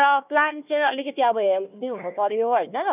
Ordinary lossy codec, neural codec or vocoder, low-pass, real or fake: none; codec, 16 kHz, 1 kbps, FunCodec, trained on Chinese and English, 50 frames a second; 3.6 kHz; fake